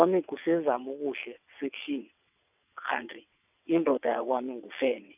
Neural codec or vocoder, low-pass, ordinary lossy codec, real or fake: vocoder, 22.05 kHz, 80 mel bands, WaveNeXt; 3.6 kHz; none; fake